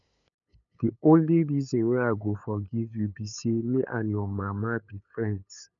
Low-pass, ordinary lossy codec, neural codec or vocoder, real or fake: 7.2 kHz; none; codec, 16 kHz, 8 kbps, FunCodec, trained on LibriTTS, 25 frames a second; fake